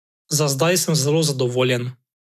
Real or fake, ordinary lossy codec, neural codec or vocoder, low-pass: real; none; none; 14.4 kHz